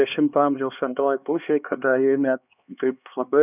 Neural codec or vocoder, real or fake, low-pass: codec, 16 kHz, 2 kbps, X-Codec, HuBERT features, trained on LibriSpeech; fake; 3.6 kHz